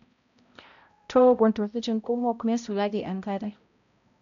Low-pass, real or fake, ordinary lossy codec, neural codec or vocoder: 7.2 kHz; fake; none; codec, 16 kHz, 0.5 kbps, X-Codec, HuBERT features, trained on balanced general audio